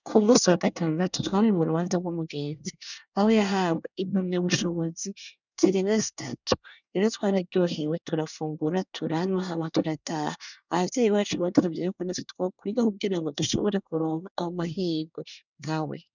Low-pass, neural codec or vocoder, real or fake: 7.2 kHz; codec, 24 kHz, 1 kbps, SNAC; fake